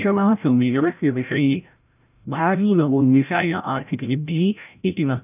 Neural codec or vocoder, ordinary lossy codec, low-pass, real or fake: codec, 16 kHz, 0.5 kbps, FreqCodec, larger model; none; 3.6 kHz; fake